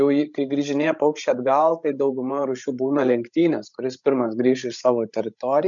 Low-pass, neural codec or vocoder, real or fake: 7.2 kHz; codec, 16 kHz, 16 kbps, FreqCodec, larger model; fake